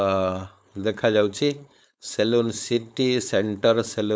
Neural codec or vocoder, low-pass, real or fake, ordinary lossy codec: codec, 16 kHz, 4.8 kbps, FACodec; none; fake; none